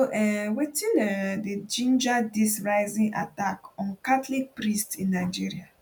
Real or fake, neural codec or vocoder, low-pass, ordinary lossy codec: real; none; 19.8 kHz; none